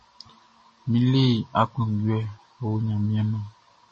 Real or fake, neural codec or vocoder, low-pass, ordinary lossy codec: real; none; 10.8 kHz; MP3, 32 kbps